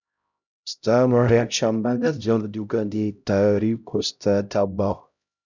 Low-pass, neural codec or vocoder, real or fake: 7.2 kHz; codec, 16 kHz, 0.5 kbps, X-Codec, HuBERT features, trained on LibriSpeech; fake